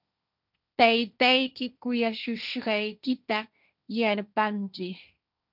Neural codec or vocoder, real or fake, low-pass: codec, 16 kHz, 1.1 kbps, Voila-Tokenizer; fake; 5.4 kHz